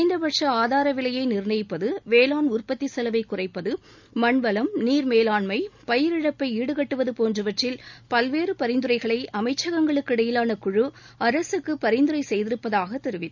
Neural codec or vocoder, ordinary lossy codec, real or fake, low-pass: none; none; real; 7.2 kHz